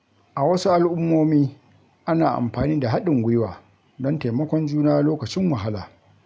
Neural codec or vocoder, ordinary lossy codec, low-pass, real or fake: none; none; none; real